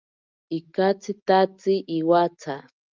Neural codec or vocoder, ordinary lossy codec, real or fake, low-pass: none; Opus, 24 kbps; real; 7.2 kHz